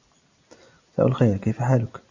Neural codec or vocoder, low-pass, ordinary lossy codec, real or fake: none; 7.2 kHz; Opus, 64 kbps; real